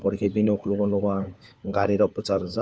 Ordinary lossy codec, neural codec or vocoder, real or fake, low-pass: none; codec, 16 kHz, 4 kbps, FunCodec, trained on LibriTTS, 50 frames a second; fake; none